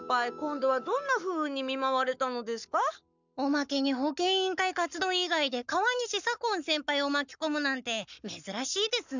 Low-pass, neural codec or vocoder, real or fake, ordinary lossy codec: 7.2 kHz; codec, 44.1 kHz, 7.8 kbps, Pupu-Codec; fake; none